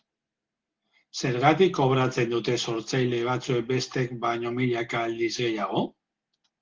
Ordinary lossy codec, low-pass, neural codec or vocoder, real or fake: Opus, 16 kbps; 7.2 kHz; none; real